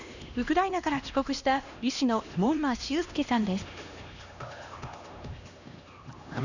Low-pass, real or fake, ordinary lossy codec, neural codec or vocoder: 7.2 kHz; fake; none; codec, 16 kHz, 1 kbps, X-Codec, HuBERT features, trained on LibriSpeech